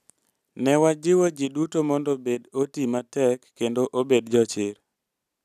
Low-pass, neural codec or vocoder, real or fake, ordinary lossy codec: 14.4 kHz; none; real; none